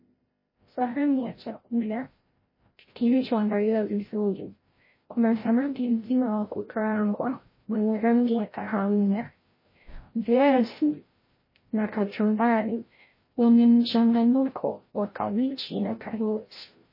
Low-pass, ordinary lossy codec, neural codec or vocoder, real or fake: 5.4 kHz; MP3, 24 kbps; codec, 16 kHz, 0.5 kbps, FreqCodec, larger model; fake